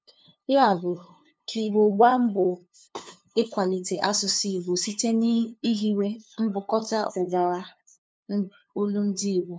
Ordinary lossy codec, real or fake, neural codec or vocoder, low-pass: none; fake; codec, 16 kHz, 2 kbps, FunCodec, trained on LibriTTS, 25 frames a second; none